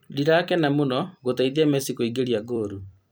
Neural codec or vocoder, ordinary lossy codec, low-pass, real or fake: vocoder, 44.1 kHz, 128 mel bands every 256 samples, BigVGAN v2; none; none; fake